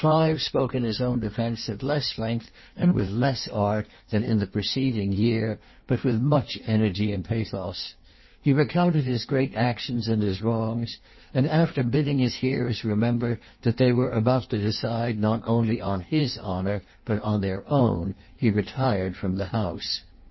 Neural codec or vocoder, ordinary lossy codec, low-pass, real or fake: codec, 16 kHz in and 24 kHz out, 1.1 kbps, FireRedTTS-2 codec; MP3, 24 kbps; 7.2 kHz; fake